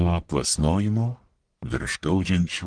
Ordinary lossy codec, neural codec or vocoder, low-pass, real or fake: Opus, 24 kbps; codec, 44.1 kHz, 1.7 kbps, Pupu-Codec; 9.9 kHz; fake